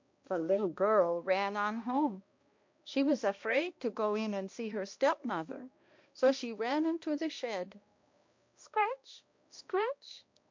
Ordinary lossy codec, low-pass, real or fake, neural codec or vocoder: MP3, 48 kbps; 7.2 kHz; fake; codec, 16 kHz, 1 kbps, X-Codec, HuBERT features, trained on balanced general audio